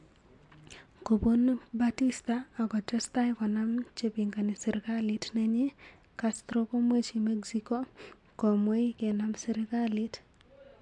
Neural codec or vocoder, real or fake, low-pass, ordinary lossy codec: none; real; 10.8 kHz; MP3, 64 kbps